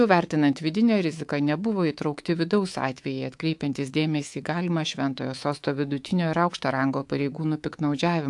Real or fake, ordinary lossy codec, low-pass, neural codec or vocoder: fake; AAC, 64 kbps; 10.8 kHz; codec, 24 kHz, 3.1 kbps, DualCodec